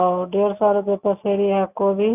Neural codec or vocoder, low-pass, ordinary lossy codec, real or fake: none; 3.6 kHz; none; real